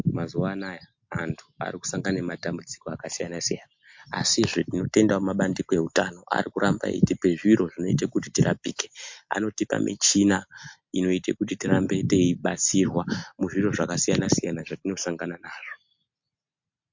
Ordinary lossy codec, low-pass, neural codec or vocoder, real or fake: MP3, 48 kbps; 7.2 kHz; none; real